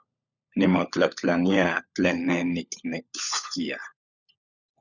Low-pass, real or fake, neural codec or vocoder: 7.2 kHz; fake; codec, 16 kHz, 16 kbps, FunCodec, trained on LibriTTS, 50 frames a second